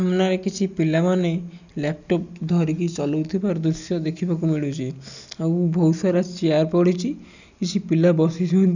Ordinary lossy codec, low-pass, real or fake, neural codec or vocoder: none; 7.2 kHz; real; none